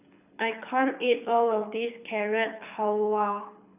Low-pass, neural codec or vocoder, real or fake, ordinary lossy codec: 3.6 kHz; codec, 24 kHz, 6 kbps, HILCodec; fake; none